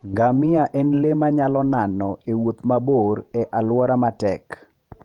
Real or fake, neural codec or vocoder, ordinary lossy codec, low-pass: fake; vocoder, 48 kHz, 128 mel bands, Vocos; Opus, 32 kbps; 19.8 kHz